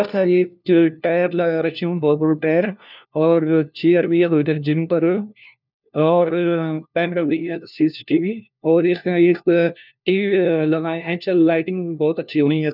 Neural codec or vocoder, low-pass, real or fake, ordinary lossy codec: codec, 16 kHz, 1 kbps, FunCodec, trained on LibriTTS, 50 frames a second; 5.4 kHz; fake; none